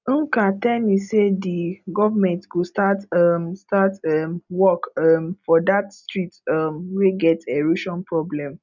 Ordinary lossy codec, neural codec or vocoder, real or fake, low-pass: none; none; real; 7.2 kHz